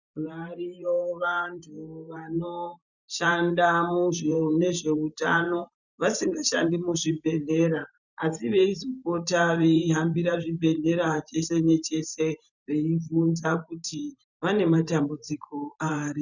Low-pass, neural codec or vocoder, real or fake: 7.2 kHz; vocoder, 44.1 kHz, 128 mel bands every 512 samples, BigVGAN v2; fake